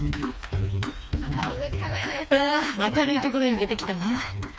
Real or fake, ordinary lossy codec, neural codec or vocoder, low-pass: fake; none; codec, 16 kHz, 2 kbps, FreqCodec, smaller model; none